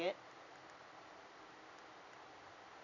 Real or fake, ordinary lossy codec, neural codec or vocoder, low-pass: real; none; none; 7.2 kHz